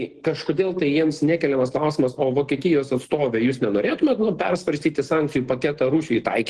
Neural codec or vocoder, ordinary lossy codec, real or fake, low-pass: none; Opus, 16 kbps; real; 10.8 kHz